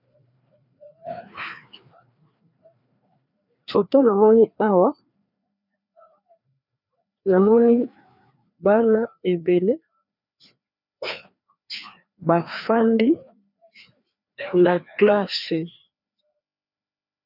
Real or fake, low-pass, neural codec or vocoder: fake; 5.4 kHz; codec, 16 kHz, 2 kbps, FreqCodec, larger model